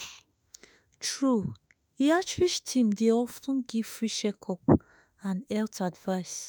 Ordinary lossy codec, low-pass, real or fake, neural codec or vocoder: none; none; fake; autoencoder, 48 kHz, 32 numbers a frame, DAC-VAE, trained on Japanese speech